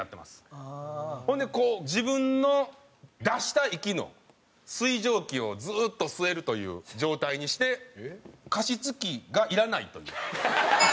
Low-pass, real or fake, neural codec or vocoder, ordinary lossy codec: none; real; none; none